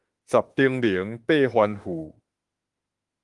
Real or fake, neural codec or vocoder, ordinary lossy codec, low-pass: fake; autoencoder, 48 kHz, 32 numbers a frame, DAC-VAE, trained on Japanese speech; Opus, 24 kbps; 10.8 kHz